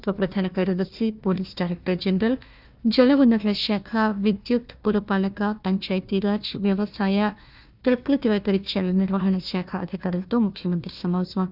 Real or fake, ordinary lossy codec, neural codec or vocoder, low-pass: fake; none; codec, 16 kHz, 1 kbps, FunCodec, trained on Chinese and English, 50 frames a second; 5.4 kHz